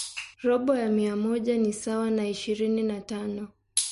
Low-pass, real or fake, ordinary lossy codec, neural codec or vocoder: 14.4 kHz; real; MP3, 48 kbps; none